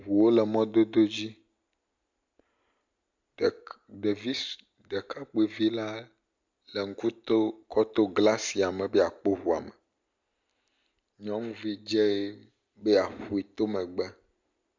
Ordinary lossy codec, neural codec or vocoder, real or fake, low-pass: MP3, 64 kbps; none; real; 7.2 kHz